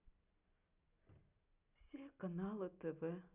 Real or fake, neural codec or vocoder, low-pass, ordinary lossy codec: real; none; 3.6 kHz; none